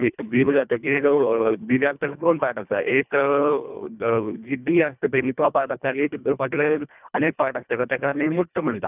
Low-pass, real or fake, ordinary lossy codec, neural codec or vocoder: 3.6 kHz; fake; none; codec, 24 kHz, 1.5 kbps, HILCodec